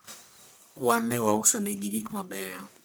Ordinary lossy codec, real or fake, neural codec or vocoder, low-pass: none; fake; codec, 44.1 kHz, 1.7 kbps, Pupu-Codec; none